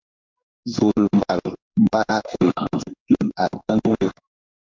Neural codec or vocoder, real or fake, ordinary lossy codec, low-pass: codec, 16 kHz in and 24 kHz out, 1 kbps, XY-Tokenizer; fake; MP3, 64 kbps; 7.2 kHz